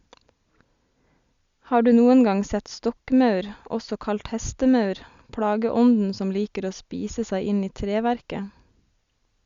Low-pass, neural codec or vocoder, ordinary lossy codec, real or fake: 7.2 kHz; none; Opus, 64 kbps; real